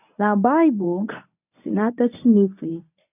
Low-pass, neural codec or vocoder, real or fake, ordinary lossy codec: 3.6 kHz; codec, 24 kHz, 0.9 kbps, WavTokenizer, medium speech release version 1; fake; none